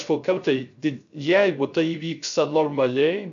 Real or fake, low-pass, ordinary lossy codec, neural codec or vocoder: fake; 7.2 kHz; AAC, 64 kbps; codec, 16 kHz, 0.3 kbps, FocalCodec